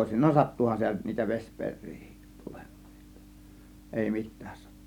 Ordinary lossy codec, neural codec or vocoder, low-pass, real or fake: none; none; 19.8 kHz; real